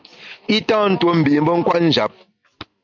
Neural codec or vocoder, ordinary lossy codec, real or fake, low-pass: none; MP3, 64 kbps; real; 7.2 kHz